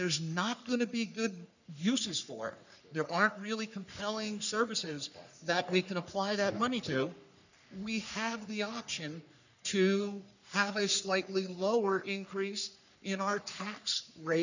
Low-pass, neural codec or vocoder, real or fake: 7.2 kHz; codec, 44.1 kHz, 3.4 kbps, Pupu-Codec; fake